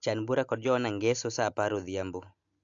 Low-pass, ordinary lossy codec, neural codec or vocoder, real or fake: 7.2 kHz; AAC, 64 kbps; none; real